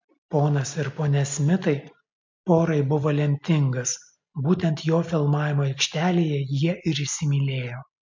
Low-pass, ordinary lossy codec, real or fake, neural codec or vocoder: 7.2 kHz; MP3, 48 kbps; real; none